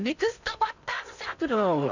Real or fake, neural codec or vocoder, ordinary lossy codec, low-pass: fake; codec, 16 kHz in and 24 kHz out, 0.8 kbps, FocalCodec, streaming, 65536 codes; none; 7.2 kHz